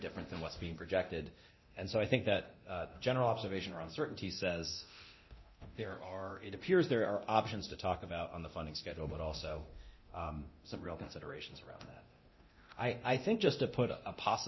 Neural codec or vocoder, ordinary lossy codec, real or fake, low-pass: codec, 24 kHz, 0.9 kbps, DualCodec; MP3, 24 kbps; fake; 7.2 kHz